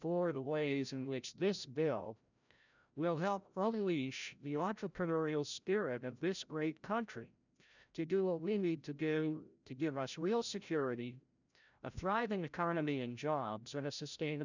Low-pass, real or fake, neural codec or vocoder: 7.2 kHz; fake; codec, 16 kHz, 0.5 kbps, FreqCodec, larger model